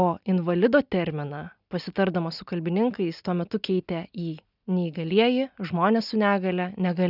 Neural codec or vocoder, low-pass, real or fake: none; 5.4 kHz; real